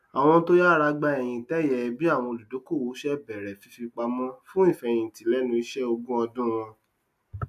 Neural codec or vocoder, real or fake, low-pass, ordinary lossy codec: none; real; 14.4 kHz; AAC, 96 kbps